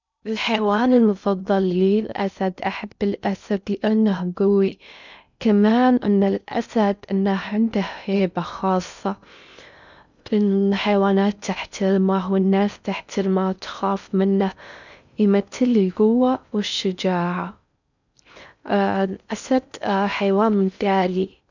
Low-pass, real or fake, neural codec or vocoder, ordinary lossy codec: 7.2 kHz; fake; codec, 16 kHz in and 24 kHz out, 0.6 kbps, FocalCodec, streaming, 2048 codes; none